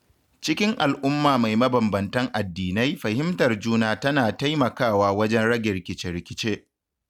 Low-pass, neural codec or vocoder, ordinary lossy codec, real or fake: 19.8 kHz; none; none; real